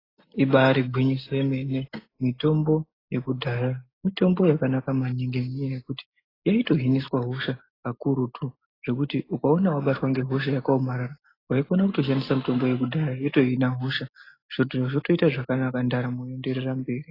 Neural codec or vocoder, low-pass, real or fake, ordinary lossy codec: none; 5.4 kHz; real; AAC, 24 kbps